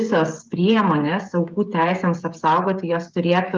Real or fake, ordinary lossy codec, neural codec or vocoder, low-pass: fake; Opus, 24 kbps; codec, 16 kHz, 16 kbps, FreqCodec, smaller model; 7.2 kHz